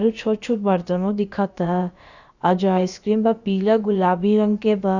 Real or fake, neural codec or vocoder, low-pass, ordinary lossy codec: fake; codec, 16 kHz, about 1 kbps, DyCAST, with the encoder's durations; 7.2 kHz; Opus, 64 kbps